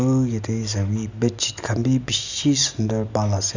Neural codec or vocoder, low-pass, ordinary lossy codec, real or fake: none; 7.2 kHz; none; real